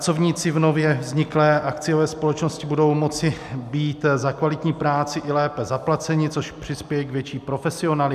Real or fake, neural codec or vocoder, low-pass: real; none; 14.4 kHz